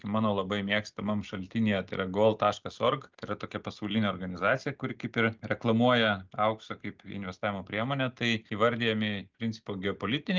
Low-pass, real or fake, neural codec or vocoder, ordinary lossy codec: 7.2 kHz; real; none; Opus, 24 kbps